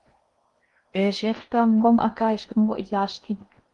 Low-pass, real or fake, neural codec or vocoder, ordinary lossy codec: 10.8 kHz; fake; codec, 16 kHz in and 24 kHz out, 0.8 kbps, FocalCodec, streaming, 65536 codes; Opus, 24 kbps